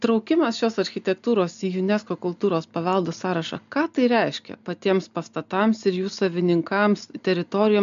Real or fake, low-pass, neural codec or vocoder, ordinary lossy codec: real; 7.2 kHz; none; MP3, 64 kbps